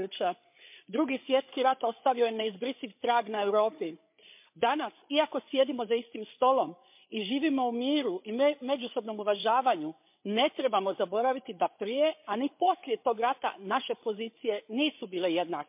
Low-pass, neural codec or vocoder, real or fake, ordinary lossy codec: 3.6 kHz; codec, 16 kHz, 8 kbps, FreqCodec, larger model; fake; MP3, 32 kbps